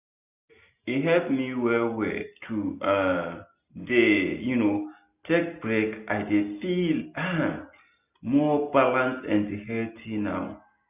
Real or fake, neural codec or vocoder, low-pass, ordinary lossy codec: real; none; 3.6 kHz; none